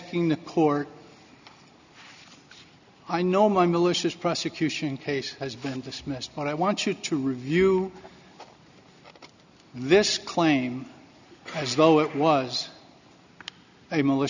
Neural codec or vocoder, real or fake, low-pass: none; real; 7.2 kHz